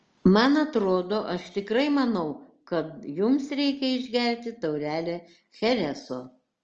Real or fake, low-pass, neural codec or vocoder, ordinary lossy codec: real; 7.2 kHz; none; Opus, 24 kbps